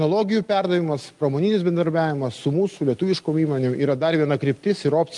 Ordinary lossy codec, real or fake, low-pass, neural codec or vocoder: Opus, 32 kbps; real; 10.8 kHz; none